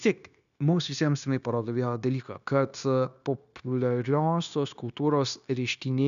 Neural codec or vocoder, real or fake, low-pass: codec, 16 kHz, 0.9 kbps, LongCat-Audio-Codec; fake; 7.2 kHz